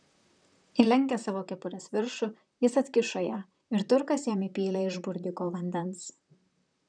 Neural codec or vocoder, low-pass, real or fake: vocoder, 44.1 kHz, 128 mel bands, Pupu-Vocoder; 9.9 kHz; fake